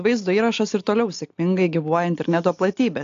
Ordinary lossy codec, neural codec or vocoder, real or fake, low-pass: AAC, 48 kbps; none; real; 7.2 kHz